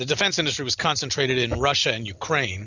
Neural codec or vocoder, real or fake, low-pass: none; real; 7.2 kHz